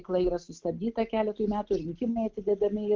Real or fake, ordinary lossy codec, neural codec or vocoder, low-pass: real; Opus, 16 kbps; none; 7.2 kHz